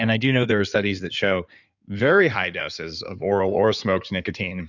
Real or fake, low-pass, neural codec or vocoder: fake; 7.2 kHz; codec, 16 kHz in and 24 kHz out, 2.2 kbps, FireRedTTS-2 codec